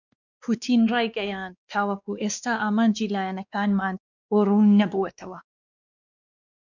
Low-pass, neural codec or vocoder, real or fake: 7.2 kHz; codec, 16 kHz, 2 kbps, X-Codec, WavLM features, trained on Multilingual LibriSpeech; fake